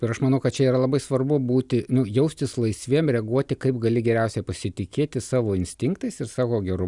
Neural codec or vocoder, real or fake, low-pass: none; real; 10.8 kHz